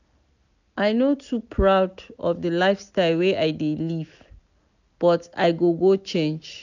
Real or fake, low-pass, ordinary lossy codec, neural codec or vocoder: real; 7.2 kHz; none; none